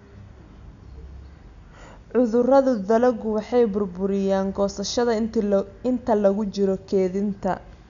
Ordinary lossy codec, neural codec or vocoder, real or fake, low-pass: none; none; real; 7.2 kHz